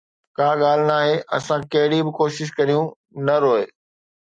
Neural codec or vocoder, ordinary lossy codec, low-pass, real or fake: none; MP3, 96 kbps; 9.9 kHz; real